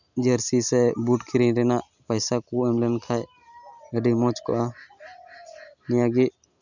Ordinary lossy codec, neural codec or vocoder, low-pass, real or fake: none; none; 7.2 kHz; real